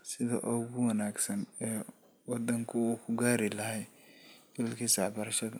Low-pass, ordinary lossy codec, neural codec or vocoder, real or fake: none; none; none; real